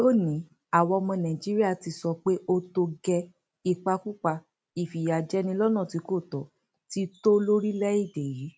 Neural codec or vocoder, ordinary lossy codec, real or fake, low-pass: none; none; real; none